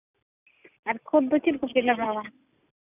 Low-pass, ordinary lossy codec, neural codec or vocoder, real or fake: 3.6 kHz; none; none; real